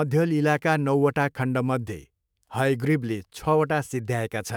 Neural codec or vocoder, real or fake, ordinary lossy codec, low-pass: autoencoder, 48 kHz, 128 numbers a frame, DAC-VAE, trained on Japanese speech; fake; none; none